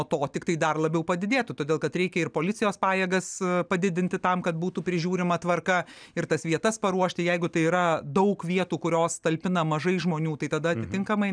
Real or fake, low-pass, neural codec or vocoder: real; 9.9 kHz; none